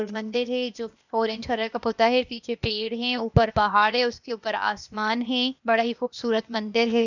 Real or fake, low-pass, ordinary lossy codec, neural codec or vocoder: fake; 7.2 kHz; none; codec, 16 kHz, 0.8 kbps, ZipCodec